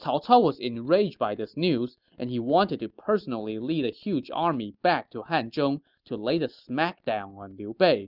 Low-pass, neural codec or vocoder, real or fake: 5.4 kHz; none; real